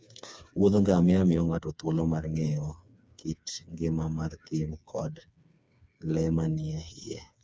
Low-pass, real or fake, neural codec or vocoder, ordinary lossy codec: none; fake; codec, 16 kHz, 4 kbps, FreqCodec, smaller model; none